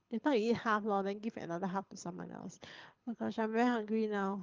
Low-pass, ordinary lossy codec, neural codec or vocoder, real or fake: 7.2 kHz; Opus, 24 kbps; codec, 24 kHz, 6 kbps, HILCodec; fake